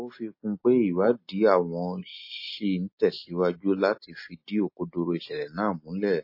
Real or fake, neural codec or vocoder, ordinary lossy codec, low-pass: real; none; MP3, 24 kbps; 5.4 kHz